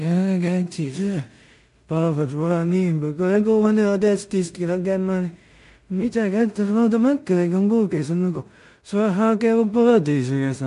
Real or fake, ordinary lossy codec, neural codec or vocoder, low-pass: fake; MP3, 64 kbps; codec, 16 kHz in and 24 kHz out, 0.4 kbps, LongCat-Audio-Codec, two codebook decoder; 10.8 kHz